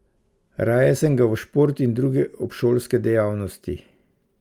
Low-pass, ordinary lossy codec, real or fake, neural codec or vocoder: 19.8 kHz; Opus, 32 kbps; real; none